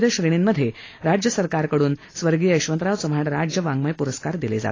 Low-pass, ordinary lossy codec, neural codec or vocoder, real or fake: 7.2 kHz; AAC, 32 kbps; none; real